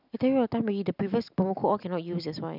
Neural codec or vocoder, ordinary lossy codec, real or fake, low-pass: none; none; real; 5.4 kHz